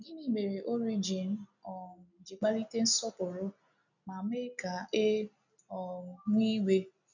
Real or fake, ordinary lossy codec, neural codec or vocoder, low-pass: real; none; none; 7.2 kHz